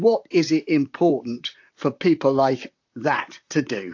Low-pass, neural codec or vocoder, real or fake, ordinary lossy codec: 7.2 kHz; vocoder, 44.1 kHz, 128 mel bands, Pupu-Vocoder; fake; AAC, 48 kbps